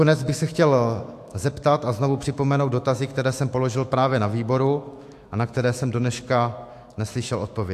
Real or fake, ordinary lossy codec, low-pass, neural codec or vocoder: fake; AAC, 64 kbps; 14.4 kHz; autoencoder, 48 kHz, 128 numbers a frame, DAC-VAE, trained on Japanese speech